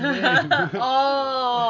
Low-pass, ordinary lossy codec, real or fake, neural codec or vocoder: 7.2 kHz; none; real; none